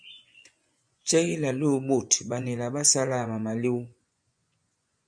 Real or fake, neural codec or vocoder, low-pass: fake; vocoder, 24 kHz, 100 mel bands, Vocos; 9.9 kHz